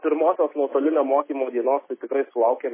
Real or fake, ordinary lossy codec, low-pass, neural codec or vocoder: real; MP3, 16 kbps; 3.6 kHz; none